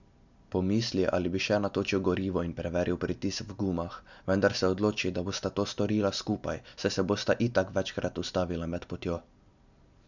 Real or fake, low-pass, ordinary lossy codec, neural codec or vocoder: real; 7.2 kHz; none; none